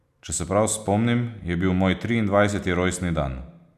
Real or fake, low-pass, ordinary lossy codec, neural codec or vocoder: real; 14.4 kHz; none; none